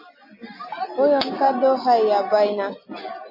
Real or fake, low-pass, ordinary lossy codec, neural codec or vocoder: real; 5.4 kHz; MP3, 24 kbps; none